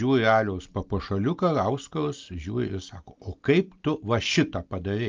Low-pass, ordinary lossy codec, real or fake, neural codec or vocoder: 7.2 kHz; Opus, 24 kbps; real; none